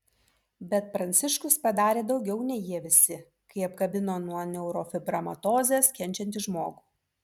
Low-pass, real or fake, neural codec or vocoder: 19.8 kHz; real; none